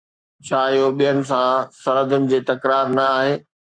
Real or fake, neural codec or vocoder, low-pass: fake; codec, 44.1 kHz, 7.8 kbps, Pupu-Codec; 9.9 kHz